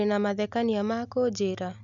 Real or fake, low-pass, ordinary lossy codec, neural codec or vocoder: real; 7.2 kHz; none; none